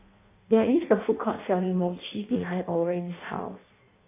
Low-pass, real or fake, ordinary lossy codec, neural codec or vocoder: 3.6 kHz; fake; AAC, 24 kbps; codec, 16 kHz in and 24 kHz out, 0.6 kbps, FireRedTTS-2 codec